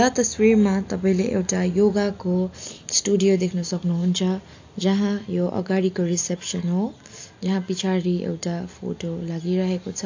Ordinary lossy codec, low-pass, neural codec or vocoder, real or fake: AAC, 48 kbps; 7.2 kHz; none; real